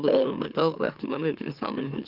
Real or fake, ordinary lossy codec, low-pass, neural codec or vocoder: fake; Opus, 32 kbps; 5.4 kHz; autoencoder, 44.1 kHz, a latent of 192 numbers a frame, MeloTTS